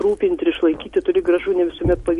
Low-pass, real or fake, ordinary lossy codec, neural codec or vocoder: 14.4 kHz; real; MP3, 48 kbps; none